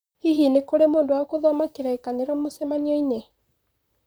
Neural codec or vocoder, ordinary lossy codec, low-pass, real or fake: vocoder, 44.1 kHz, 128 mel bands, Pupu-Vocoder; none; none; fake